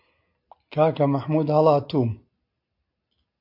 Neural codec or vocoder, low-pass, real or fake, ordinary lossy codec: none; 5.4 kHz; real; MP3, 48 kbps